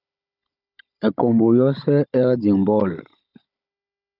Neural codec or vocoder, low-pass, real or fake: codec, 16 kHz, 16 kbps, FunCodec, trained on Chinese and English, 50 frames a second; 5.4 kHz; fake